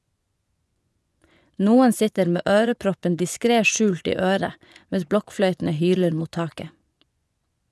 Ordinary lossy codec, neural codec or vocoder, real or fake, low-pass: none; vocoder, 24 kHz, 100 mel bands, Vocos; fake; none